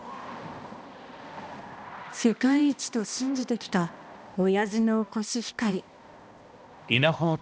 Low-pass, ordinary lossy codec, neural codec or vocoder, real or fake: none; none; codec, 16 kHz, 1 kbps, X-Codec, HuBERT features, trained on balanced general audio; fake